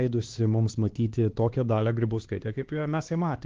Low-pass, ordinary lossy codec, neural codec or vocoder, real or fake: 7.2 kHz; Opus, 16 kbps; codec, 16 kHz, 2 kbps, X-Codec, HuBERT features, trained on LibriSpeech; fake